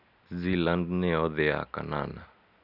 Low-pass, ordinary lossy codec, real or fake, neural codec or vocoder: 5.4 kHz; none; real; none